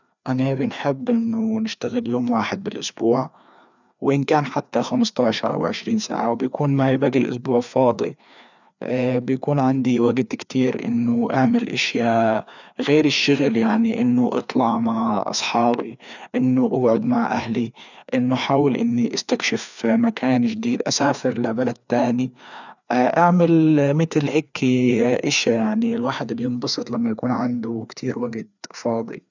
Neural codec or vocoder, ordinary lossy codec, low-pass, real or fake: codec, 16 kHz, 2 kbps, FreqCodec, larger model; none; 7.2 kHz; fake